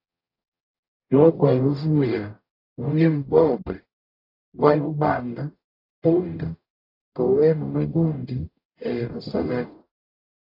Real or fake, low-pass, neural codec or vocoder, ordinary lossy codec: fake; 5.4 kHz; codec, 44.1 kHz, 0.9 kbps, DAC; AAC, 32 kbps